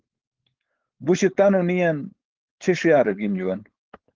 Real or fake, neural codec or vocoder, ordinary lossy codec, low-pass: fake; codec, 16 kHz, 4.8 kbps, FACodec; Opus, 16 kbps; 7.2 kHz